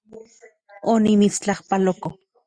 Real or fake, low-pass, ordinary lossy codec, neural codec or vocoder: real; 9.9 kHz; Opus, 64 kbps; none